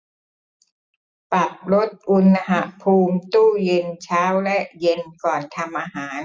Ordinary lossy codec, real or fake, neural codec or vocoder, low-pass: none; real; none; none